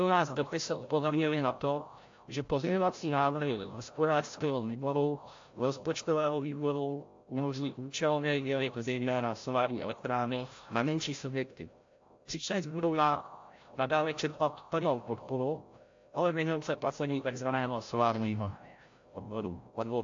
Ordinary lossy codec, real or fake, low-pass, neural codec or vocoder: AAC, 48 kbps; fake; 7.2 kHz; codec, 16 kHz, 0.5 kbps, FreqCodec, larger model